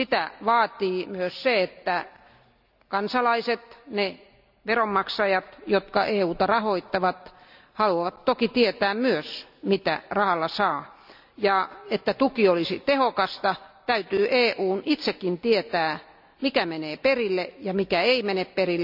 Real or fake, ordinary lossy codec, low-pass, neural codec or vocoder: real; none; 5.4 kHz; none